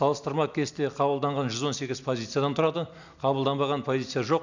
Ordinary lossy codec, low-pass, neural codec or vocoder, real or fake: none; 7.2 kHz; none; real